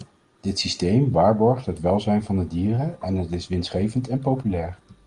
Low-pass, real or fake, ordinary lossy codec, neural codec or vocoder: 9.9 kHz; real; Opus, 24 kbps; none